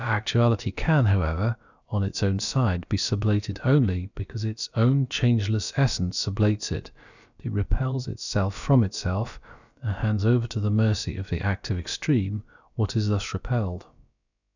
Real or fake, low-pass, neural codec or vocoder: fake; 7.2 kHz; codec, 16 kHz, about 1 kbps, DyCAST, with the encoder's durations